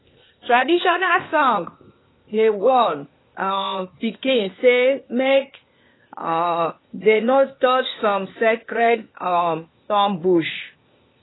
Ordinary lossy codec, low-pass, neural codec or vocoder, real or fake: AAC, 16 kbps; 7.2 kHz; codec, 16 kHz, 0.8 kbps, ZipCodec; fake